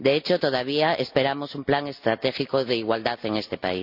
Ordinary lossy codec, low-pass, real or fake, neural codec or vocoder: none; 5.4 kHz; real; none